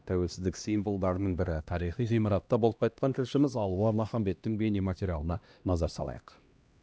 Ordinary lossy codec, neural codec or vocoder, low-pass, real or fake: none; codec, 16 kHz, 1 kbps, X-Codec, HuBERT features, trained on LibriSpeech; none; fake